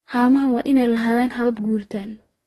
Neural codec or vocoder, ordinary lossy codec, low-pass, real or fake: codec, 44.1 kHz, 2.6 kbps, DAC; AAC, 32 kbps; 19.8 kHz; fake